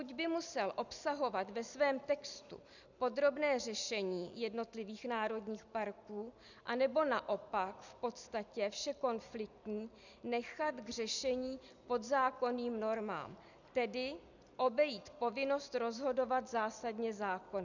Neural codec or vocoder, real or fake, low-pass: none; real; 7.2 kHz